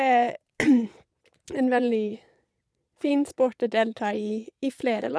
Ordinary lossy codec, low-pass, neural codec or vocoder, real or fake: none; none; vocoder, 22.05 kHz, 80 mel bands, Vocos; fake